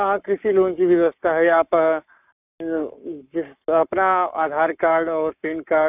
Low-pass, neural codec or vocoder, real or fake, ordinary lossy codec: 3.6 kHz; codec, 44.1 kHz, 7.8 kbps, Pupu-Codec; fake; none